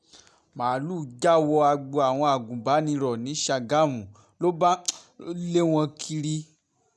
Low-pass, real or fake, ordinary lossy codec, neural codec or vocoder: none; real; none; none